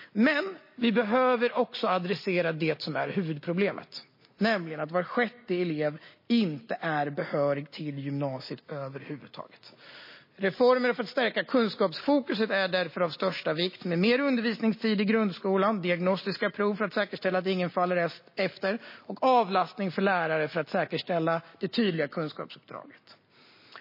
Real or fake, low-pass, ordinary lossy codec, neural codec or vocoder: real; 5.4 kHz; MP3, 24 kbps; none